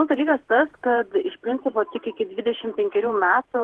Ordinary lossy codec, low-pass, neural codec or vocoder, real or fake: Opus, 16 kbps; 10.8 kHz; vocoder, 48 kHz, 128 mel bands, Vocos; fake